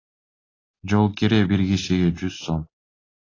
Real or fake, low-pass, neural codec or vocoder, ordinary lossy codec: real; 7.2 kHz; none; AAC, 32 kbps